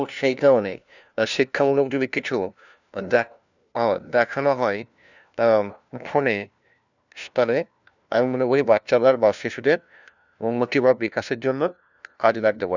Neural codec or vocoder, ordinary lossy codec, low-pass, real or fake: codec, 16 kHz, 1 kbps, FunCodec, trained on LibriTTS, 50 frames a second; none; 7.2 kHz; fake